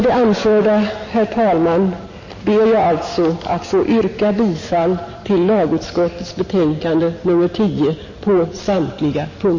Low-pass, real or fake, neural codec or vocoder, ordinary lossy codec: 7.2 kHz; real; none; MP3, 32 kbps